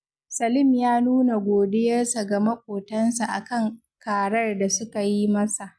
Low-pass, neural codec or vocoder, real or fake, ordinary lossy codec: none; none; real; none